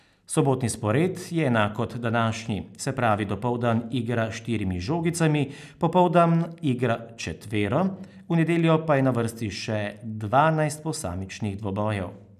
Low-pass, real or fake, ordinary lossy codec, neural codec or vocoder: 14.4 kHz; real; none; none